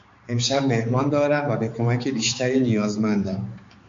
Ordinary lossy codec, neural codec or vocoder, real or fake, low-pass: MP3, 48 kbps; codec, 16 kHz, 4 kbps, X-Codec, HuBERT features, trained on balanced general audio; fake; 7.2 kHz